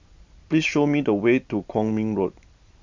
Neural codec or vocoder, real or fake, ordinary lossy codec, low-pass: none; real; MP3, 64 kbps; 7.2 kHz